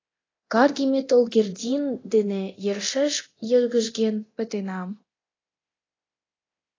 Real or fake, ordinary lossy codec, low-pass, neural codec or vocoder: fake; AAC, 32 kbps; 7.2 kHz; codec, 24 kHz, 0.9 kbps, DualCodec